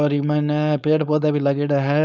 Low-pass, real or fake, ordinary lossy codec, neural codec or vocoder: none; fake; none; codec, 16 kHz, 4.8 kbps, FACodec